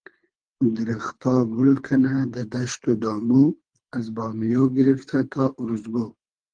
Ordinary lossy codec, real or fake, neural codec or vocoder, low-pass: Opus, 24 kbps; fake; codec, 24 kHz, 3 kbps, HILCodec; 9.9 kHz